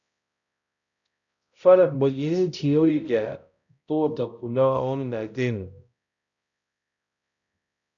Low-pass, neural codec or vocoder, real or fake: 7.2 kHz; codec, 16 kHz, 0.5 kbps, X-Codec, HuBERT features, trained on balanced general audio; fake